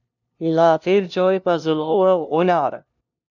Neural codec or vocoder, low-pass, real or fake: codec, 16 kHz, 0.5 kbps, FunCodec, trained on LibriTTS, 25 frames a second; 7.2 kHz; fake